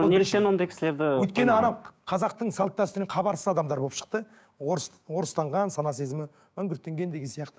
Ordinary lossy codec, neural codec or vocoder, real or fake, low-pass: none; codec, 16 kHz, 6 kbps, DAC; fake; none